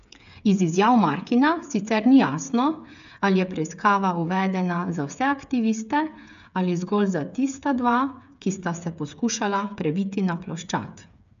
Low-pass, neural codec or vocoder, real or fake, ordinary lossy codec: 7.2 kHz; codec, 16 kHz, 8 kbps, FreqCodec, smaller model; fake; none